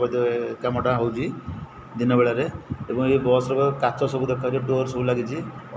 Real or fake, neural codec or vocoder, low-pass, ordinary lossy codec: real; none; none; none